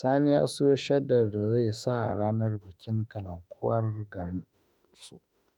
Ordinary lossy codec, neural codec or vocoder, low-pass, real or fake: none; autoencoder, 48 kHz, 32 numbers a frame, DAC-VAE, trained on Japanese speech; 19.8 kHz; fake